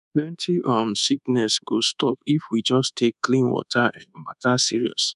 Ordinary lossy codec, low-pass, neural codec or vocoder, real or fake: none; 10.8 kHz; codec, 24 kHz, 1.2 kbps, DualCodec; fake